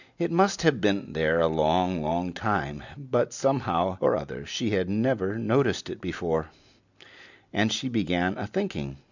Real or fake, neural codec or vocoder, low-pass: real; none; 7.2 kHz